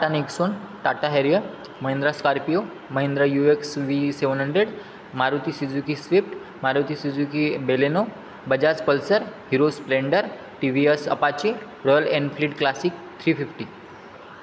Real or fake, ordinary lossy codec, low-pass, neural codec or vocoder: real; none; none; none